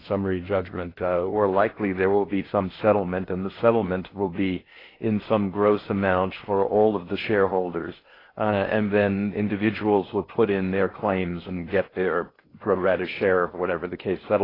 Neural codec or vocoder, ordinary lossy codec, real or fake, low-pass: codec, 16 kHz in and 24 kHz out, 0.8 kbps, FocalCodec, streaming, 65536 codes; AAC, 24 kbps; fake; 5.4 kHz